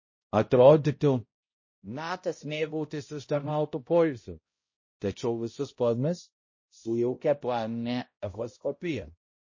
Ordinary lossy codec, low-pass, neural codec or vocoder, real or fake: MP3, 32 kbps; 7.2 kHz; codec, 16 kHz, 0.5 kbps, X-Codec, HuBERT features, trained on balanced general audio; fake